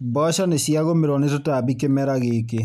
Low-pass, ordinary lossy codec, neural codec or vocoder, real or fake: 14.4 kHz; none; none; real